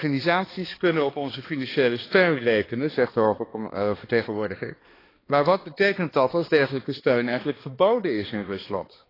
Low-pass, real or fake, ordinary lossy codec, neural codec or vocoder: 5.4 kHz; fake; AAC, 24 kbps; codec, 16 kHz, 2 kbps, X-Codec, HuBERT features, trained on balanced general audio